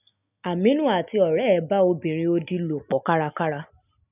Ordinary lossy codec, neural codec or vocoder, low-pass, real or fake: none; none; 3.6 kHz; real